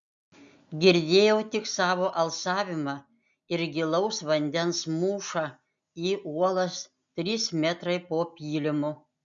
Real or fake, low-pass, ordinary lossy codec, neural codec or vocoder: real; 7.2 kHz; MP3, 64 kbps; none